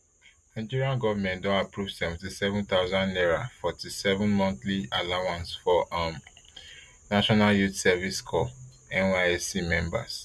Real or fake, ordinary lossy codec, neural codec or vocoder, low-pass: real; none; none; none